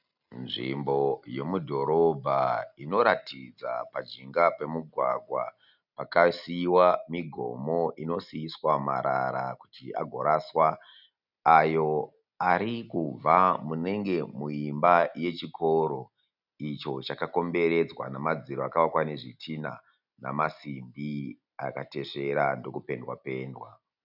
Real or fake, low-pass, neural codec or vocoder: real; 5.4 kHz; none